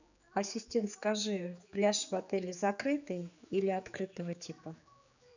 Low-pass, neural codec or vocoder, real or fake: 7.2 kHz; codec, 16 kHz, 4 kbps, X-Codec, HuBERT features, trained on general audio; fake